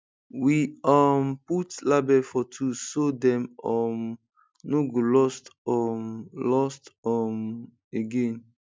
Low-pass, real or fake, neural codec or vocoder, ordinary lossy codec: none; real; none; none